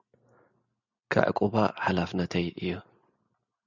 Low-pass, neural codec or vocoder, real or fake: 7.2 kHz; none; real